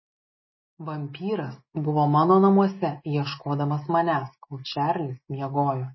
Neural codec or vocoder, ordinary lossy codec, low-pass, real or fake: none; MP3, 24 kbps; 7.2 kHz; real